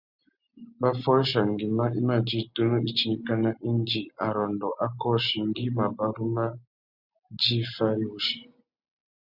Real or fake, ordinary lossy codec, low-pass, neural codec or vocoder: real; Opus, 64 kbps; 5.4 kHz; none